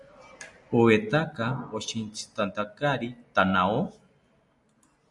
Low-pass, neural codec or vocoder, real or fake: 10.8 kHz; none; real